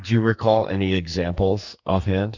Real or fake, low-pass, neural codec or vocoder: fake; 7.2 kHz; codec, 44.1 kHz, 2.6 kbps, SNAC